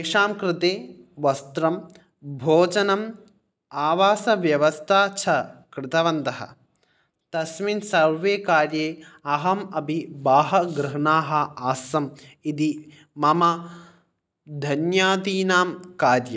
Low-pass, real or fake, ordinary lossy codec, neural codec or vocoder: none; real; none; none